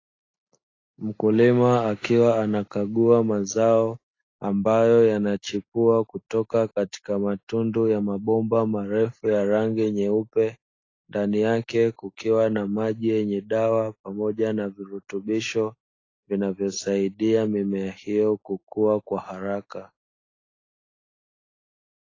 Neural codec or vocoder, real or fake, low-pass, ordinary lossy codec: none; real; 7.2 kHz; AAC, 32 kbps